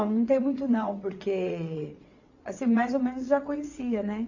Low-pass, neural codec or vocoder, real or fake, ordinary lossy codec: 7.2 kHz; vocoder, 22.05 kHz, 80 mel bands, WaveNeXt; fake; AAC, 48 kbps